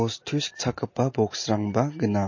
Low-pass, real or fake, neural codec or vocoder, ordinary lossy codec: 7.2 kHz; real; none; MP3, 32 kbps